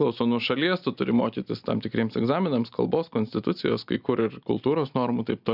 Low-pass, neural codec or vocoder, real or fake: 5.4 kHz; none; real